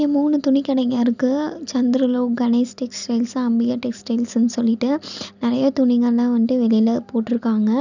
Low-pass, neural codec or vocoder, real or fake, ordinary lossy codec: 7.2 kHz; none; real; none